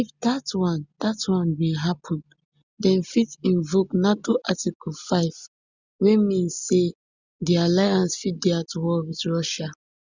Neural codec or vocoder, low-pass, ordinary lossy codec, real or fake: none; 7.2 kHz; Opus, 64 kbps; real